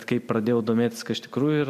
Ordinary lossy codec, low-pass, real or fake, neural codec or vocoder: AAC, 96 kbps; 14.4 kHz; real; none